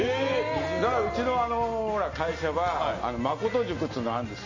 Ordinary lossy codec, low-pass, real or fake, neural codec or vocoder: MP3, 32 kbps; 7.2 kHz; real; none